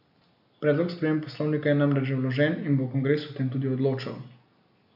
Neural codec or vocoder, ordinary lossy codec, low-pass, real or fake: none; AAC, 48 kbps; 5.4 kHz; real